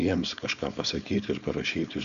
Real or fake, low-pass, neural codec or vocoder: fake; 7.2 kHz; codec, 16 kHz, 4.8 kbps, FACodec